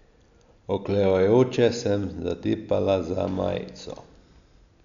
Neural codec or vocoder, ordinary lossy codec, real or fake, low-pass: none; none; real; 7.2 kHz